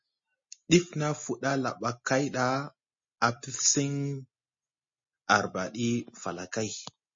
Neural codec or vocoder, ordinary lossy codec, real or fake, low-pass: none; MP3, 32 kbps; real; 7.2 kHz